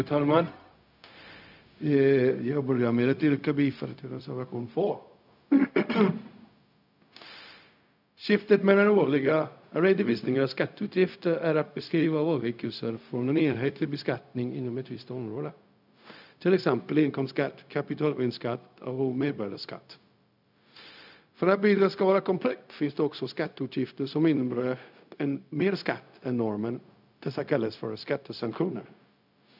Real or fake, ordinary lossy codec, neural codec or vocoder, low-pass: fake; none; codec, 16 kHz, 0.4 kbps, LongCat-Audio-Codec; 5.4 kHz